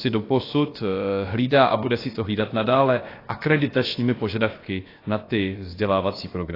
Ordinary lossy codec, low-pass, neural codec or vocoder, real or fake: AAC, 24 kbps; 5.4 kHz; codec, 16 kHz, about 1 kbps, DyCAST, with the encoder's durations; fake